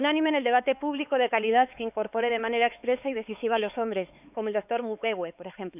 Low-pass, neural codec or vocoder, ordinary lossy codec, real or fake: 3.6 kHz; codec, 16 kHz, 4 kbps, X-Codec, HuBERT features, trained on LibriSpeech; none; fake